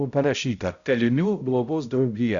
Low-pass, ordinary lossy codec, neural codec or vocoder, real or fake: 7.2 kHz; Opus, 64 kbps; codec, 16 kHz, 0.5 kbps, X-Codec, HuBERT features, trained on balanced general audio; fake